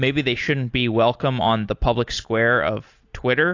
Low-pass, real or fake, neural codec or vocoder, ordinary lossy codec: 7.2 kHz; real; none; AAC, 48 kbps